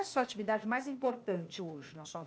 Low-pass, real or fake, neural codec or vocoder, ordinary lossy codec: none; fake; codec, 16 kHz, 0.8 kbps, ZipCodec; none